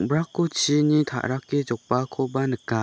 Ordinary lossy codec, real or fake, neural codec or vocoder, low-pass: none; real; none; none